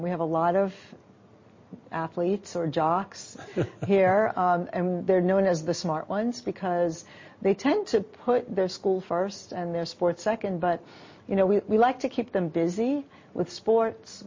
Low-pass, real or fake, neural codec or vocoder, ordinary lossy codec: 7.2 kHz; real; none; MP3, 32 kbps